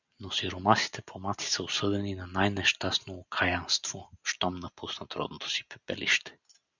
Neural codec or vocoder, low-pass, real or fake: none; 7.2 kHz; real